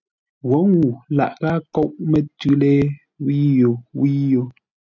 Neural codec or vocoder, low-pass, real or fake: none; 7.2 kHz; real